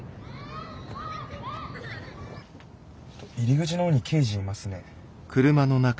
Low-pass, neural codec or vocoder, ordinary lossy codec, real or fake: none; none; none; real